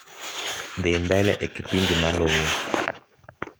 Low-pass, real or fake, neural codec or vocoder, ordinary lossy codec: none; fake; codec, 44.1 kHz, 7.8 kbps, DAC; none